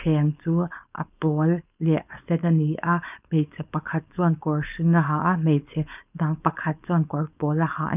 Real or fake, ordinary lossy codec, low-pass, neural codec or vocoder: fake; none; 3.6 kHz; codec, 16 kHz, 4.8 kbps, FACodec